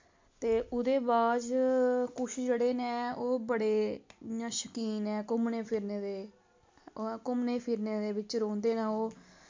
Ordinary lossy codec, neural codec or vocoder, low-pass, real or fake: MP3, 48 kbps; none; 7.2 kHz; real